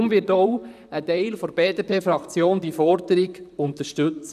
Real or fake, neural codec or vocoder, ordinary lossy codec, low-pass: fake; vocoder, 44.1 kHz, 128 mel bands, Pupu-Vocoder; none; 14.4 kHz